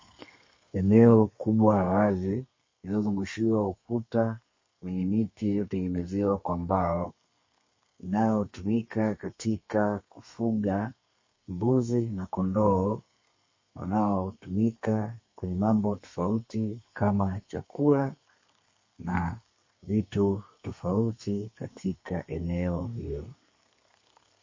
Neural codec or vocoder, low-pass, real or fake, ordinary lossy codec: codec, 32 kHz, 1.9 kbps, SNAC; 7.2 kHz; fake; MP3, 32 kbps